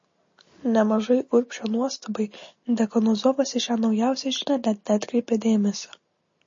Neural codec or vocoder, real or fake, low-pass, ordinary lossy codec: none; real; 7.2 kHz; MP3, 32 kbps